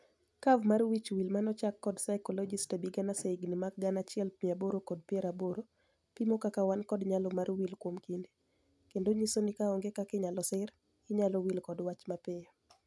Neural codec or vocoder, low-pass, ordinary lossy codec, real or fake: none; none; none; real